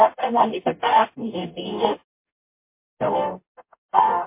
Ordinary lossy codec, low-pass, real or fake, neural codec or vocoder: MP3, 24 kbps; 3.6 kHz; fake; codec, 44.1 kHz, 0.9 kbps, DAC